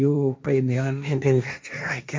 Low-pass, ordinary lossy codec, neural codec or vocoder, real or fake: none; none; codec, 16 kHz, 1.1 kbps, Voila-Tokenizer; fake